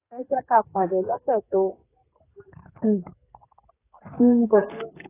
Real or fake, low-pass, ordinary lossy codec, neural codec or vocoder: fake; 3.6 kHz; AAC, 24 kbps; codec, 32 kHz, 1.9 kbps, SNAC